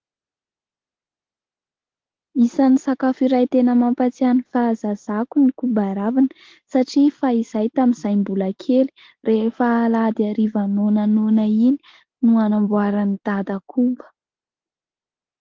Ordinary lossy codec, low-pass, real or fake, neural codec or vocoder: Opus, 16 kbps; 7.2 kHz; real; none